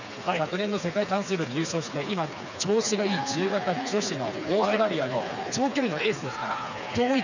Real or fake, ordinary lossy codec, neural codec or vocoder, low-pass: fake; none; codec, 16 kHz, 4 kbps, FreqCodec, smaller model; 7.2 kHz